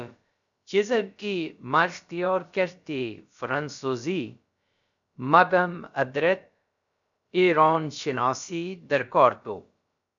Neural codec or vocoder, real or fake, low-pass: codec, 16 kHz, about 1 kbps, DyCAST, with the encoder's durations; fake; 7.2 kHz